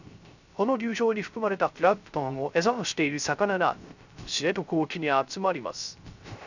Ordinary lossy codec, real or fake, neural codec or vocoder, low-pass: none; fake; codec, 16 kHz, 0.3 kbps, FocalCodec; 7.2 kHz